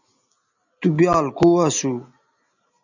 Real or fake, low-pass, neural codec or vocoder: real; 7.2 kHz; none